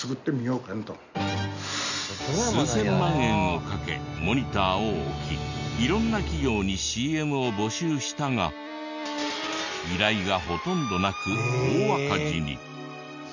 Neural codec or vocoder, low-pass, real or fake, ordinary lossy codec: none; 7.2 kHz; real; none